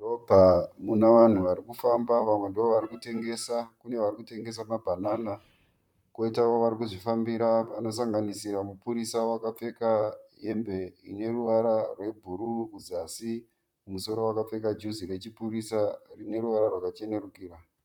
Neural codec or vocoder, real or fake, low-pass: vocoder, 44.1 kHz, 128 mel bands, Pupu-Vocoder; fake; 19.8 kHz